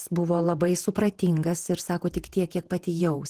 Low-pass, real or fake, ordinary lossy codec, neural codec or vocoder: 14.4 kHz; fake; Opus, 16 kbps; vocoder, 48 kHz, 128 mel bands, Vocos